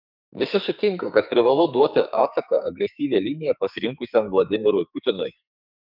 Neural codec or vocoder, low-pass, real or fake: codec, 44.1 kHz, 2.6 kbps, SNAC; 5.4 kHz; fake